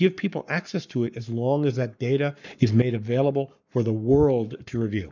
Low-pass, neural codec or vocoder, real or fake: 7.2 kHz; codec, 44.1 kHz, 7.8 kbps, Pupu-Codec; fake